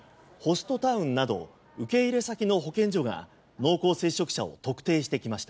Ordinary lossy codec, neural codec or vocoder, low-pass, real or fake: none; none; none; real